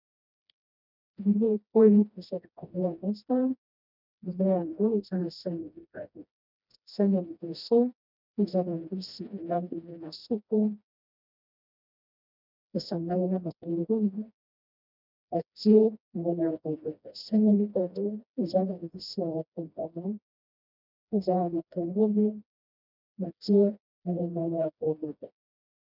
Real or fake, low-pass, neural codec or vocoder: fake; 5.4 kHz; codec, 16 kHz, 1 kbps, FreqCodec, smaller model